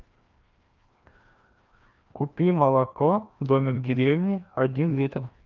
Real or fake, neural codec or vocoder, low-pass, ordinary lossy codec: fake; codec, 16 kHz, 1 kbps, FreqCodec, larger model; 7.2 kHz; Opus, 32 kbps